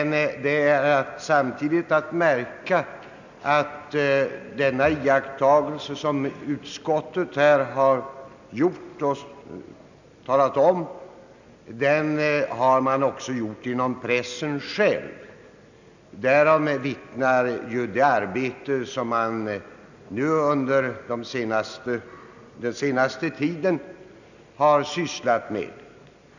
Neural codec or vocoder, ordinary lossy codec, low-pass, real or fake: none; none; 7.2 kHz; real